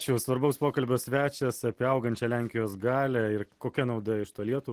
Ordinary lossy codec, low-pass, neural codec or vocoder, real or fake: Opus, 16 kbps; 14.4 kHz; none; real